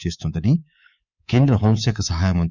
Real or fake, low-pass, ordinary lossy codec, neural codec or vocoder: fake; 7.2 kHz; none; codec, 24 kHz, 3.1 kbps, DualCodec